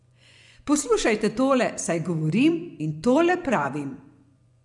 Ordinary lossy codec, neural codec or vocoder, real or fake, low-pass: none; vocoder, 24 kHz, 100 mel bands, Vocos; fake; 10.8 kHz